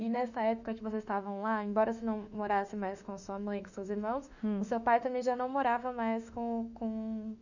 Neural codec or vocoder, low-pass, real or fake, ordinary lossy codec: autoencoder, 48 kHz, 32 numbers a frame, DAC-VAE, trained on Japanese speech; 7.2 kHz; fake; none